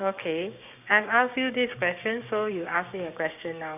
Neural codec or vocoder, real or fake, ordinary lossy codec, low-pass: codec, 16 kHz, 6 kbps, DAC; fake; none; 3.6 kHz